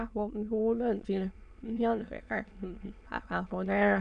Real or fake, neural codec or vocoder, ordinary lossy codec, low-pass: fake; autoencoder, 22.05 kHz, a latent of 192 numbers a frame, VITS, trained on many speakers; none; 9.9 kHz